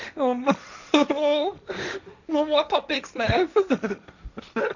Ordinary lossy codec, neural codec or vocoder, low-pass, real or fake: none; codec, 16 kHz, 1.1 kbps, Voila-Tokenizer; 7.2 kHz; fake